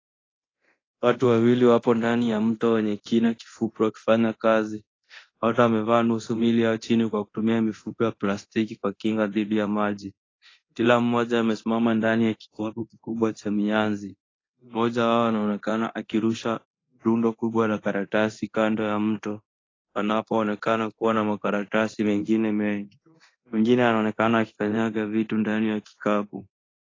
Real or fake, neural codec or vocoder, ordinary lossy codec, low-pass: fake; codec, 24 kHz, 0.9 kbps, DualCodec; AAC, 32 kbps; 7.2 kHz